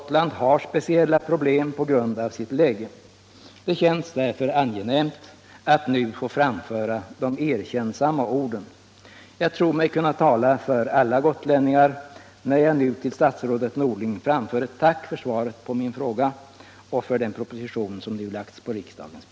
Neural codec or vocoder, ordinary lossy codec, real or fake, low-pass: none; none; real; none